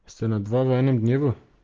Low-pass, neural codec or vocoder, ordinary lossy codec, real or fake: 7.2 kHz; none; Opus, 16 kbps; real